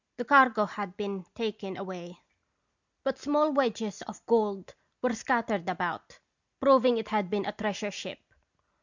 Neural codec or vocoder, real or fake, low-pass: none; real; 7.2 kHz